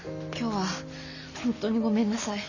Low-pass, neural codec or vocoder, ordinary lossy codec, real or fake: 7.2 kHz; none; none; real